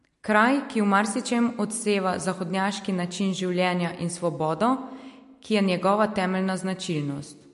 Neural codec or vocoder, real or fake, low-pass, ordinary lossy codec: none; real; 14.4 kHz; MP3, 48 kbps